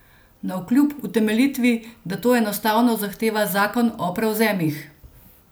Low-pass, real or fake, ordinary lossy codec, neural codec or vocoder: none; real; none; none